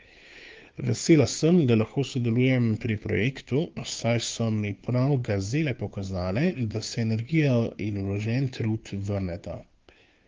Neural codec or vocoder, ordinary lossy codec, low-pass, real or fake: codec, 16 kHz, 2 kbps, FunCodec, trained on Chinese and English, 25 frames a second; Opus, 24 kbps; 7.2 kHz; fake